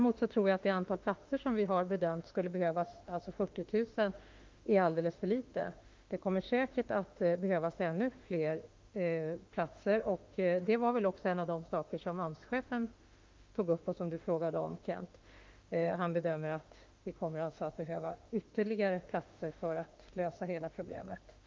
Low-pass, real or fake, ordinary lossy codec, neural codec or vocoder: 7.2 kHz; fake; Opus, 32 kbps; autoencoder, 48 kHz, 32 numbers a frame, DAC-VAE, trained on Japanese speech